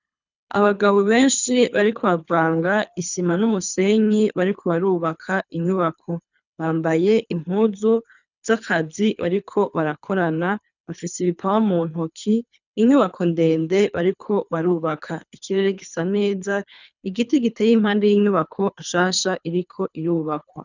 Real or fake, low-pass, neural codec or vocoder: fake; 7.2 kHz; codec, 24 kHz, 3 kbps, HILCodec